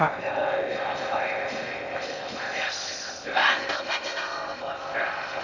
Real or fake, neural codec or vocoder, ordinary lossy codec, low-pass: fake; codec, 16 kHz in and 24 kHz out, 0.6 kbps, FocalCodec, streaming, 2048 codes; none; 7.2 kHz